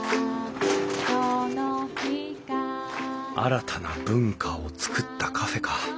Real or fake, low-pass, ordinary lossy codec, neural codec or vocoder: real; none; none; none